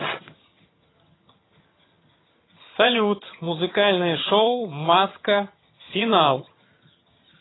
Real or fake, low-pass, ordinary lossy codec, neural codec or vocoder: fake; 7.2 kHz; AAC, 16 kbps; vocoder, 22.05 kHz, 80 mel bands, HiFi-GAN